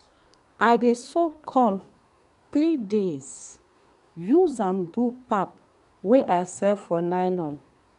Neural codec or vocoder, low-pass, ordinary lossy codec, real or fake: codec, 24 kHz, 1 kbps, SNAC; 10.8 kHz; none; fake